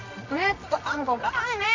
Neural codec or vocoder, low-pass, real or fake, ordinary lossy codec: codec, 24 kHz, 0.9 kbps, WavTokenizer, medium music audio release; 7.2 kHz; fake; MP3, 48 kbps